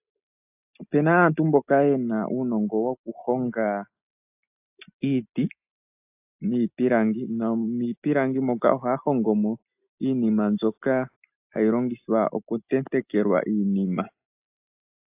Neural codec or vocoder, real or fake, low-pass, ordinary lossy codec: none; real; 3.6 kHz; AAC, 32 kbps